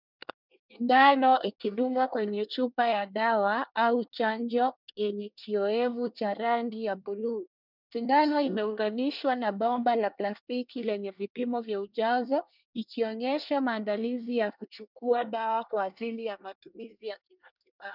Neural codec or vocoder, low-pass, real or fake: codec, 24 kHz, 1 kbps, SNAC; 5.4 kHz; fake